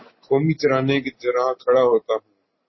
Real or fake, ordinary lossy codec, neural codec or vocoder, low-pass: real; MP3, 24 kbps; none; 7.2 kHz